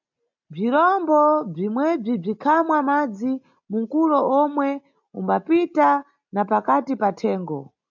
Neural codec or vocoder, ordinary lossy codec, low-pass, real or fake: none; MP3, 64 kbps; 7.2 kHz; real